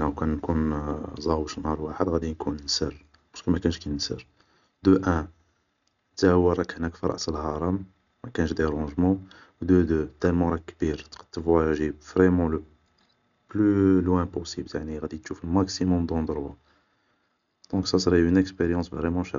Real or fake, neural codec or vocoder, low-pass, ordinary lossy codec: real; none; 7.2 kHz; none